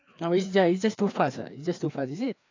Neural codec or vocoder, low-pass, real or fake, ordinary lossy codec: codec, 16 kHz, 2 kbps, FreqCodec, larger model; 7.2 kHz; fake; AAC, 48 kbps